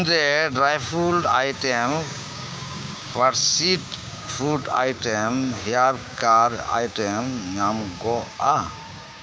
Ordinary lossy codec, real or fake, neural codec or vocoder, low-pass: none; fake; codec, 16 kHz, 6 kbps, DAC; none